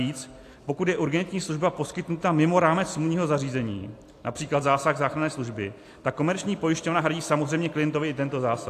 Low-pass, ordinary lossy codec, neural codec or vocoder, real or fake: 14.4 kHz; AAC, 64 kbps; none; real